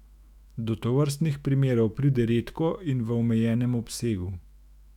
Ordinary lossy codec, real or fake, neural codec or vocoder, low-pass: none; fake; autoencoder, 48 kHz, 128 numbers a frame, DAC-VAE, trained on Japanese speech; 19.8 kHz